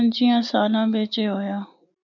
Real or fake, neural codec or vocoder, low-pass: real; none; 7.2 kHz